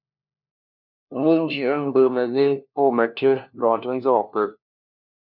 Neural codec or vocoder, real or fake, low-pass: codec, 16 kHz, 1 kbps, FunCodec, trained on LibriTTS, 50 frames a second; fake; 5.4 kHz